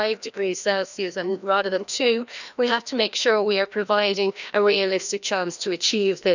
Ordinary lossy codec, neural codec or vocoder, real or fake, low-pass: none; codec, 16 kHz, 1 kbps, FreqCodec, larger model; fake; 7.2 kHz